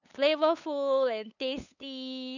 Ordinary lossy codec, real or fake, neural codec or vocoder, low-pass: none; fake; codec, 16 kHz, 8 kbps, FunCodec, trained on LibriTTS, 25 frames a second; 7.2 kHz